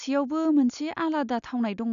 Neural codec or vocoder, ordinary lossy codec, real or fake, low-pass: none; none; real; 7.2 kHz